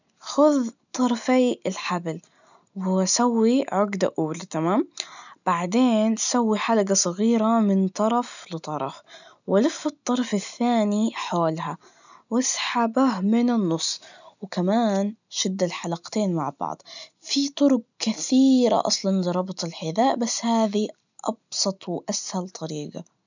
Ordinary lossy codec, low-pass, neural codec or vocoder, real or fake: none; 7.2 kHz; none; real